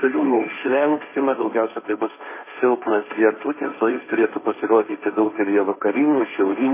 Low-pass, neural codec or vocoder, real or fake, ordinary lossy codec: 3.6 kHz; codec, 16 kHz, 1.1 kbps, Voila-Tokenizer; fake; MP3, 16 kbps